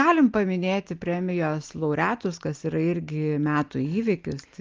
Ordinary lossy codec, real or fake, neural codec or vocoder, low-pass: Opus, 24 kbps; real; none; 7.2 kHz